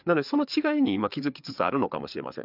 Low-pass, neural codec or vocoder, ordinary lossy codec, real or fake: 5.4 kHz; codec, 16 kHz, 4 kbps, FreqCodec, larger model; none; fake